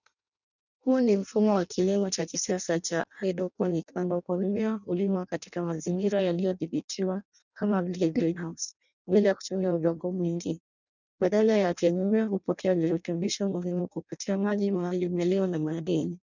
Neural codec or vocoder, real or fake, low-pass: codec, 16 kHz in and 24 kHz out, 0.6 kbps, FireRedTTS-2 codec; fake; 7.2 kHz